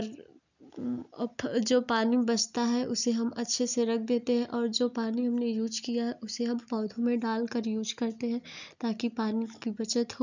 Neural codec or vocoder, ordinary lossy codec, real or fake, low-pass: none; none; real; 7.2 kHz